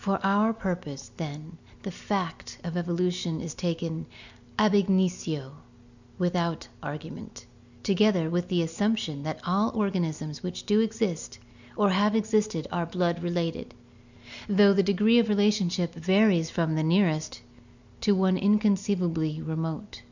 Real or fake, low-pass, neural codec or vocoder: real; 7.2 kHz; none